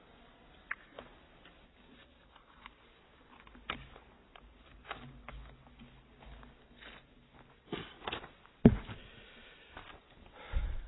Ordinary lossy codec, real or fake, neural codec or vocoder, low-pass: AAC, 16 kbps; real; none; 7.2 kHz